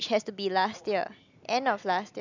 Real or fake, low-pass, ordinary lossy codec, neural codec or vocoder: real; 7.2 kHz; none; none